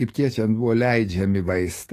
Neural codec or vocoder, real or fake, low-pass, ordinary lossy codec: codec, 44.1 kHz, 7.8 kbps, DAC; fake; 14.4 kHz; AAC, 48 kbps